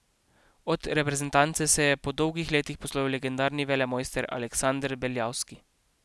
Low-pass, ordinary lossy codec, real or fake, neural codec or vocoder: none; none; real; none